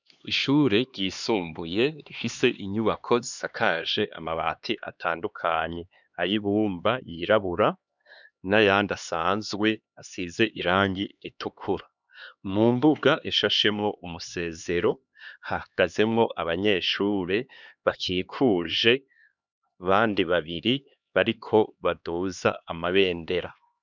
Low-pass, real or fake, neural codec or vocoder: 7.2 kHz; fake; codec, 16 kHz, 2 kbps, X-Codec, HuBERT features, trained on LibriSpeech